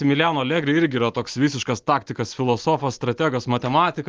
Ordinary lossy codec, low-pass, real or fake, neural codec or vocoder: Opus, 24 kbps; 7.2 kHz; real; none